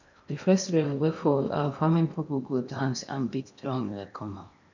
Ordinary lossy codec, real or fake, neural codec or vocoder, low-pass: none; fake; codec, 16 kHz in and 24 kHz out, 0.8 kbps, FocalCodec, streaming, 65536 codes; 7.2 kHz